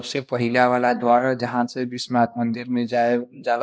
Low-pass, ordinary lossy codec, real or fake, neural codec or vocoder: none; none; fake; codec, 16 kHz, 1 kbps, X-Codec, HuBERT features, trained on LibriSpeech